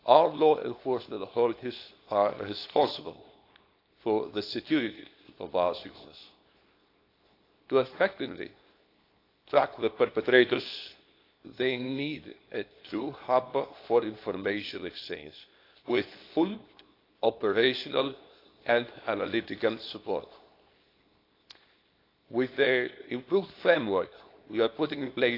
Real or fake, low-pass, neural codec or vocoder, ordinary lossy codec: fake; 5.4 kHz; codec, 24 kHz, 0.9 kbps, WavTokenizer, small release; AAC, 32 kbps